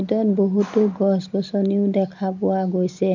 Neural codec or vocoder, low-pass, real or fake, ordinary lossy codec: none; 7.2 kHz; real; none